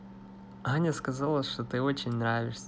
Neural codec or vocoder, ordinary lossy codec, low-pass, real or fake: none; none; none; real